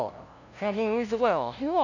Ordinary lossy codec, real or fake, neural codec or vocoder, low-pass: none; fake; codec, 16 kHz, 0.5 kbps, FunCodec, trained on LibriTTS, 25 frames a second; 7.2 kHz